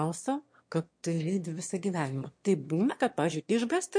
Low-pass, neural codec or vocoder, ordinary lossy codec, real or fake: 9.9 kHz; autoencoder, 22.05 kHz, a latent of 192 numbers a frame, VITS, trained on one speaker; MP3, 48 kbps; fake